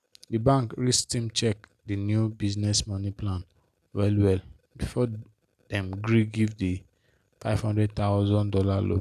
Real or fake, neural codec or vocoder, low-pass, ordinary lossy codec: real; none; 14.4 kHz; none